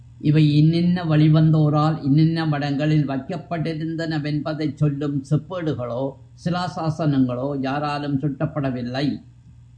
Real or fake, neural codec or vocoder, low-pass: real; none; 9.9 kHz